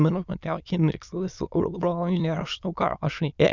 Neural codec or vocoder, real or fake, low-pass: autoencoder, 22.05 kHz, a latent of 192 numbers a frame, VITS, trained on many speakers; fake; 7.2 kHz